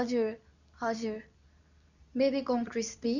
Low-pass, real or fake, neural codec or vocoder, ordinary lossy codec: 7.2 kHz; fake; codec, 24 kHz, 0.9 kbps, WavTokenizer, medium speech release version 2; none